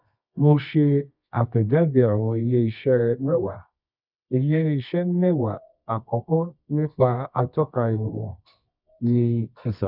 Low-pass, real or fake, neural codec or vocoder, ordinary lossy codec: 5.4 kHz; fake; codec, 24 kHz, 0.9 kbps, WavTokenizer, medium music audio release; none